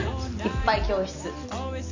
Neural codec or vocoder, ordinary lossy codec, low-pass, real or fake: none; AAC, 48 kbps; 7.2 kHz; real